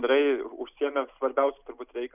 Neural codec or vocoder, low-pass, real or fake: none; 3.6 kHz; real